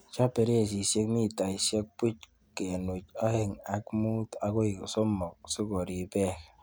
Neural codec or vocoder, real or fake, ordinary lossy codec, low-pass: none; real; none; none